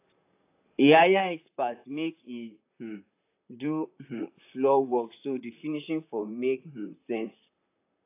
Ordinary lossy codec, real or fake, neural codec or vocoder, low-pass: AAC, 24 kbps; fake; vocoder, 44.1 kHz, 128 mel bands, Pupu-Vocoder; 3.6 kHz